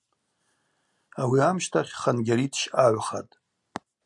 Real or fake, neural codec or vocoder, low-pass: real; none; 10.8 kHz